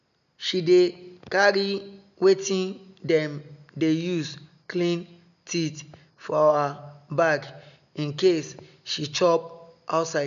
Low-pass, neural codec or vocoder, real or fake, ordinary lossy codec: 7.2 kHz; none; real; none